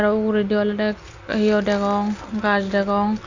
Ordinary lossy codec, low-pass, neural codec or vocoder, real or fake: Opus, 64 kbps; 7.2 kHz; none; real